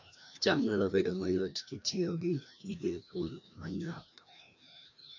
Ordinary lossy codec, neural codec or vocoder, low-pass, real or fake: none; codec, 16 kHz, 1 kbps, FreqCodec, larger model; 7.2 kHz; fake